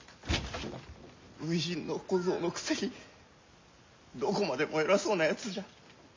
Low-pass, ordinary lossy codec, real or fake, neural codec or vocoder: 7.2 kHz; MP3, 64 kbps; real; none